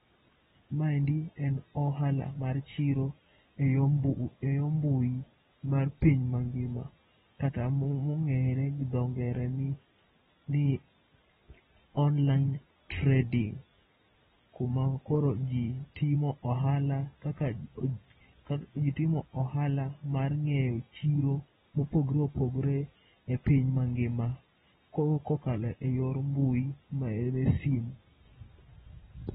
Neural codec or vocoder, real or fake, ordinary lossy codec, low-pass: none; real; AAC, 16 kbps; 10.8 kHz